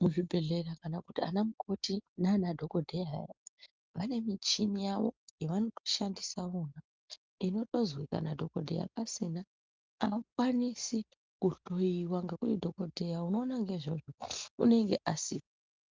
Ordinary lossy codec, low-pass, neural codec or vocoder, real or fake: Opus, 24 kbps; 7.2 kHz; none; real